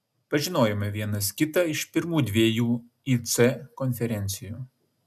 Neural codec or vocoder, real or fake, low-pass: none; real; 14.4 kHz